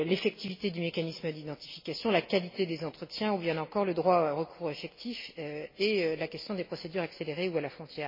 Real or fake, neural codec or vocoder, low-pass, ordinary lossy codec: real; none; 5.4 kHz; MP3, 24 kbps